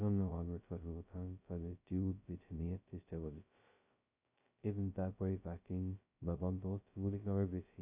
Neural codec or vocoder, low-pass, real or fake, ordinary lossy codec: codec, 16 kHz, 0.2 kbps, FocalCodec; 3.6 kHz; fake; none